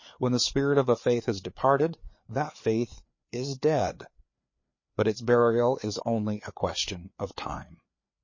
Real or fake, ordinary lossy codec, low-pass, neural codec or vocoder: fake; MP3, 32 kbps; 7.2 kHz; codec, 16 kHz, 4 kbps, FreqCodec, larger model